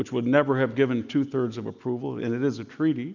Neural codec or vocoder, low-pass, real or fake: none; 7.2 kHz; real